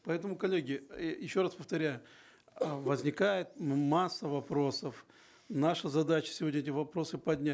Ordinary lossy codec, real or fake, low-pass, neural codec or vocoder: none; real; none; none